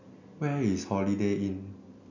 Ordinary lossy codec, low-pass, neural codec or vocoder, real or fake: none; 7.2 kHz; none; real